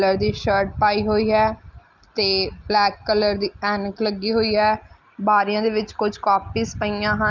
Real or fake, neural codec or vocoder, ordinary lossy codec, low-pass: real; none; Opus, 24 kbps; 7.2 kHz